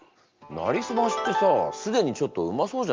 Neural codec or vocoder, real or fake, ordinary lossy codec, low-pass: none; real; Opus, 24 kbps; 7.2 kHz